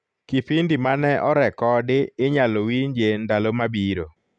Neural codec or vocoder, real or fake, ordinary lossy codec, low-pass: none; real; none; 9.9 kHz